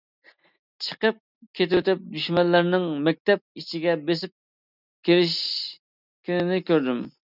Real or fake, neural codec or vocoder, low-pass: real; none; 5.4 kHz